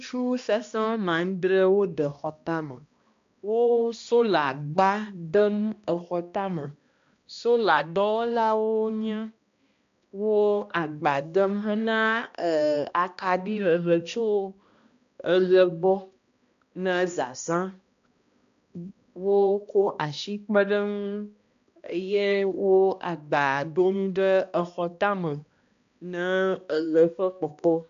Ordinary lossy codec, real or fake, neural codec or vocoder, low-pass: MP3, 64 kbps; fake; codec, 16 kHz, 1 kbps, X-Codec, HuBERT features, trained on balanced general audio; 7.2 kHz